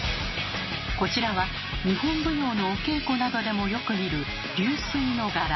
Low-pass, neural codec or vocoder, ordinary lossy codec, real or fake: 7.2 kHz; none; MP3, 24 kbps; real